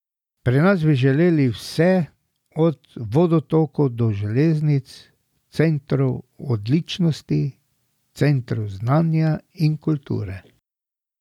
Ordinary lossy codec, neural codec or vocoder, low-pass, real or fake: none; none; 19.8 kHz; real